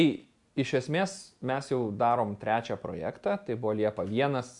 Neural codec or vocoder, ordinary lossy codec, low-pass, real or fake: none; MP3, 64 kbps; 10.8 kHz; real